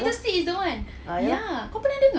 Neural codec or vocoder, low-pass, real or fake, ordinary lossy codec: none; none; real; none